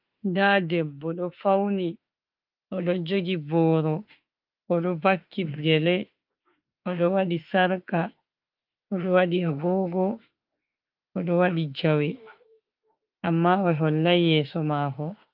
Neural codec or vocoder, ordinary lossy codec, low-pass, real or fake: autoencoder, 48 kHz, 32 numbers a frame, DAC-VAE, trained on Japanese speech; Opus, 32 kbps; 5.4 kHz; fake